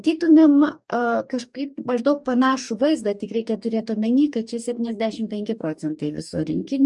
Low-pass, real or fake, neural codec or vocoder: 10.8 kHz; fake; codec, 44.1 kHz, 2.6 kbps, DAC